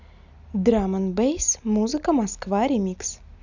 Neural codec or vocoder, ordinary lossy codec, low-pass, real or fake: none; none; 7.2 kHz; real